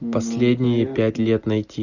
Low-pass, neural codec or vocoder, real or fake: 7.2 kHz; none; real